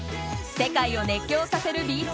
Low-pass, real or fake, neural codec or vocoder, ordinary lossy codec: none; real; none; none